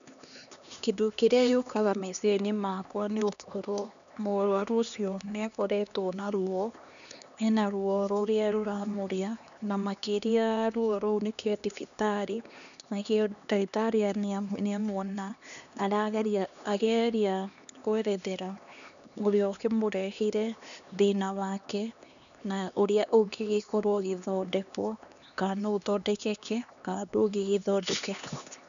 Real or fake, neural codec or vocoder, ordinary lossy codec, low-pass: fake; codec, 16 kHz, 2 kbps, X-Codec, HuBERT features, trained on LibriSpeech; none; 7.2 kHz